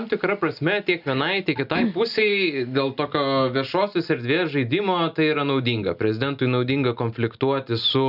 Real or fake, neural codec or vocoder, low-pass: real; none; 5.4 kHz